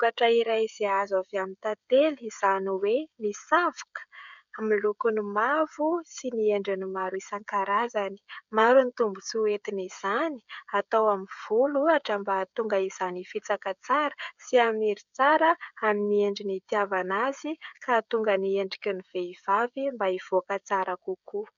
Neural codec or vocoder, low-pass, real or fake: codec, 16 kHz, 16 kbps, FreqCodec, smaller model; 7.2 kHz; fake